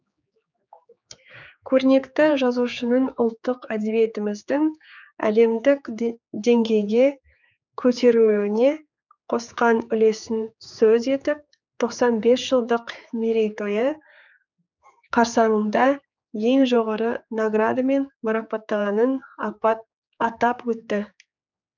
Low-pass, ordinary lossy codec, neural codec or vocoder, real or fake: 7.2 kHz; none; codec, 16 kHz, 4 kbps, X-Codec, HuBERT features, trained on general audio; fake